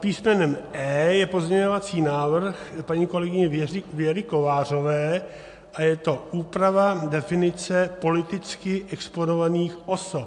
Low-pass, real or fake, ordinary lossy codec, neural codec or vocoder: 10.8 kHz; real; AAC, 64 kbps; none